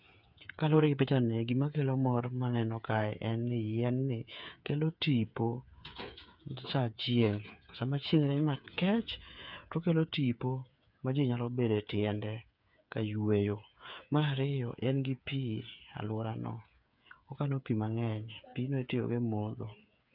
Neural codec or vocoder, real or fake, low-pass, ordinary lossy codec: codec, 16 kHz, 8 kbps, FreqCodec, smaller model; fake; 5.4 kHz; none